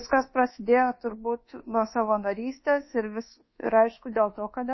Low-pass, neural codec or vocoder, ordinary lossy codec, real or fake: 7.2 kHz; codec, 24 kHz, 1.2 kbps, DualCodec; MP3, 24 kbps; fake